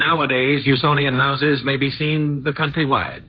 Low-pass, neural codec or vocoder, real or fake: 7.2 kHz; codec, 16 kHz, 1.1 kbps, Voila-Tokenizer; fake